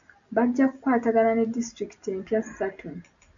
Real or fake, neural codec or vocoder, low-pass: real; none; 7.2 kHz